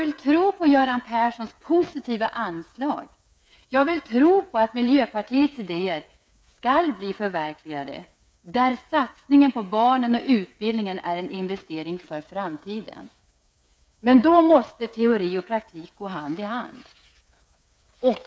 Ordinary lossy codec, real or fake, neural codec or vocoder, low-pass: none; fake; codec, 16 kHz, 8 kbps, FreqCodec, smaller model; none